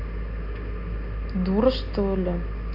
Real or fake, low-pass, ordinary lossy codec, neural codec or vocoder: real; 5.4 kHz; none; none